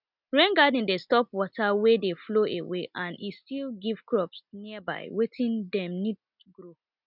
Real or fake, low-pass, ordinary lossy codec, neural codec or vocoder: real; 5.4 kHz; none; none